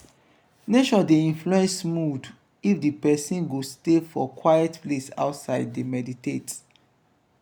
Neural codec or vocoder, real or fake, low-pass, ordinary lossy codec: none; real; none; none